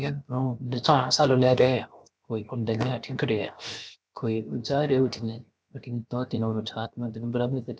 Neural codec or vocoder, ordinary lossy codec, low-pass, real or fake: codec, 16 kHz, 0.7 kbps, FocalCodec; none; none; fake